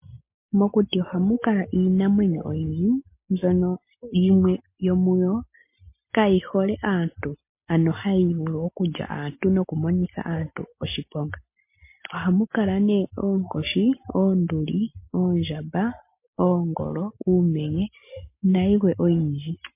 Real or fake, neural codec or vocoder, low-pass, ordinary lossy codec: real; none; 3.6 kHz; MP3, 24 kbps